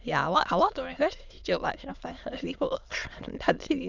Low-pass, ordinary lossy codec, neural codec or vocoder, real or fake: 7.2 kHz; none; autoencoder, 22.05 kHz, a latent of 192 numbers a frame, VITS, trained on many speakers; fake